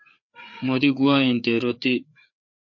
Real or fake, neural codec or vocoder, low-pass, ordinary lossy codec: fake; codec, 16 kHz, 4 kbps, FreqCodec, larger model; 7.2 kHz; MP3, 48 kbps